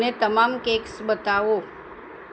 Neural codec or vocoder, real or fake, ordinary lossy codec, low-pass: none; real; none; none